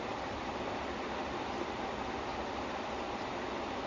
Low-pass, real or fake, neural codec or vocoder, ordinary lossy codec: 7.2 kHz; real; none; MP3, 64 kbps